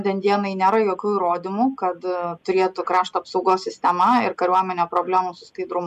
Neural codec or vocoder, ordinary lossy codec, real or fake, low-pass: none; MP3, 96 kbps; real; 14.4 kHz